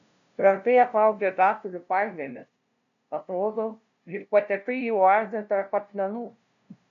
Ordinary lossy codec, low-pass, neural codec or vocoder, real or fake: AAC, 96 kbps; 7.2 kHz; codec, 16 kHz, 0.5 kbps, FunCodec, trained on LibriTTS, 25 frames a second; fake